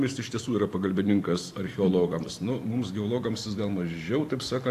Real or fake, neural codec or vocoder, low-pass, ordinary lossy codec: fake; vocoder, 44.1 kHz, 128 mel bands every 512 samples, BigVGAN v2; 14.4 kHz; AAC, 64 kbps